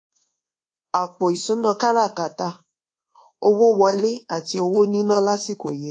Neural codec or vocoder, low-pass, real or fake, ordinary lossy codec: codec, 24 kHz, 1.2 kbps, DualCodec; 9.9 kHz; fake; AAC, 48 kbps